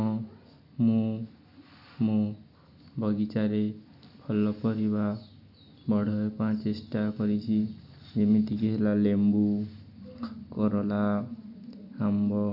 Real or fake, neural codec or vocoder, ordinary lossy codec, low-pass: real; none; none; 5.4 kHz